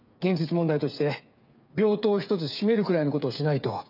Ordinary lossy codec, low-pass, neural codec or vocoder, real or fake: none; 5.4 kHz; codec, 16 kHz, 8 kbps, FreqCodec, smaller model; fake